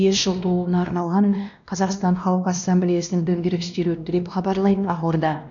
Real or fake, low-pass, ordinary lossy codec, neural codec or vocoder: fake; 7.2 kHz; none; codec, 16 kHz, 1 kbps, X-Codec, WavLM features, trained on Multilingual LibriSpeech